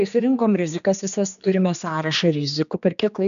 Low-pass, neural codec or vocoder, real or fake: 7.2 kHz; codec, 16 kHz, 2 kbps, X-Codec, HuBERT features, trained on general audio; fake